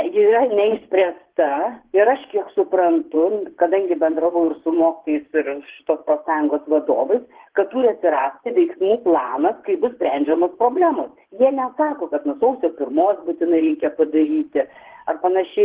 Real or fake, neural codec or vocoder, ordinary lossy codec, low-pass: fake; vocoder, 24 kHz, 100 mel bands, Vocos; Opus, 16 kbps; 3.6 kHz